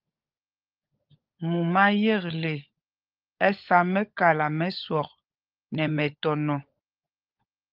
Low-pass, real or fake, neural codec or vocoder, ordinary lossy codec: 5.4 kHz; fake; codec, 16 kHz, 16 kbps, FunCodec, trained on LibriTTS, 50 frames a second; Opus, 24 kbps